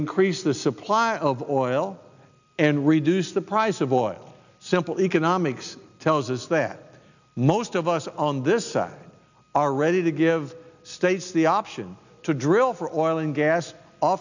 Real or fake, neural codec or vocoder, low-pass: real; none; 7.2 kHz